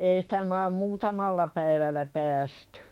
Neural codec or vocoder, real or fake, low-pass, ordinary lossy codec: autoencoder, 48 kHz, 32 numbers a frame, DAC-VAE, trained on Japanese speech; fake; 19.8 kHz; MP3, 64 kbps